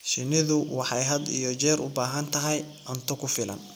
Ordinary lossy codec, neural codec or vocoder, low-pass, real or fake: none; none; none; real